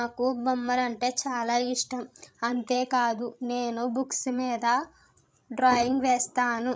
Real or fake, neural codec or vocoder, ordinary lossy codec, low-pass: fake; codec, 16 kHz, 16 kbps, FreqCodec, larger model; none; none